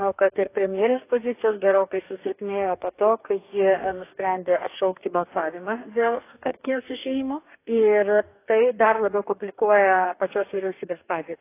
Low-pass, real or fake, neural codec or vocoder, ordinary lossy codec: 3.6 kHz; fake; codec, 44.1 kHz, 2.6 kbps, DAC; AAC, 24 kbps